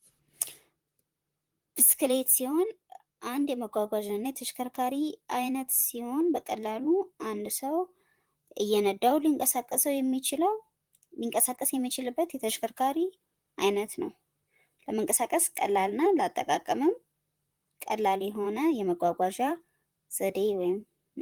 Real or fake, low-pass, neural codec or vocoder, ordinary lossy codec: fake; 19.8 kHz; vocoder, 44.1 kHz, 128 mel bands, Pupu-Vocoder; Opus, 24 kbps